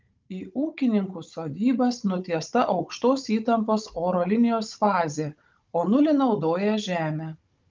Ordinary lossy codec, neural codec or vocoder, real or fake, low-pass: Opus, 24 kbps; codec, 16 kHz, 16 kbps, FunCodec, trained on Chinese and English, 50 frames a second; fake; 7.2 kHz